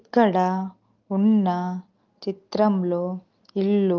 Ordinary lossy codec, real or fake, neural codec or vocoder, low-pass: Opus, 32 kbps; real; none; 7.2 kHz